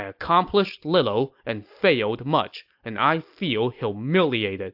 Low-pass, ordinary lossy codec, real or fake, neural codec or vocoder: 5.4 kHz; AAC, 48 kbps; real; none